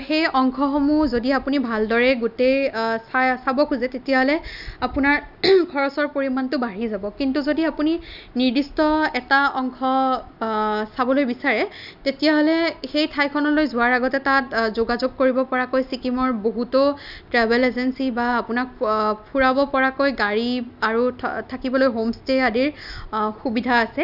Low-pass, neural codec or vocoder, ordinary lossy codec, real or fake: 5.4 kHz; none; none; real